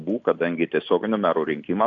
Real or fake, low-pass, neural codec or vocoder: real; 7.2 kHz; none